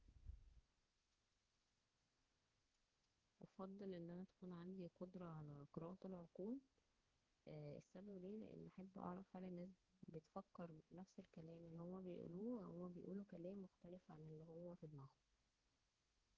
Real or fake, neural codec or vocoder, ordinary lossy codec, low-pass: fake; codec, 16 kHz, 4 kbps, X-Codec, HuBERT features, trained on balanced general audio; Opus, 16 kbps; 7.2 kHz